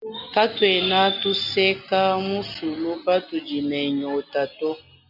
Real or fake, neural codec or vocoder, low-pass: real; none; 5.4 kHz